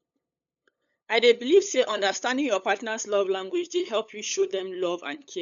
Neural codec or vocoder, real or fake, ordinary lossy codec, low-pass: codec, 16 kHz, 8 kbps, FunCodec, trained on LibriTTS, 25 frames a second; fake; none; 7.2 kHz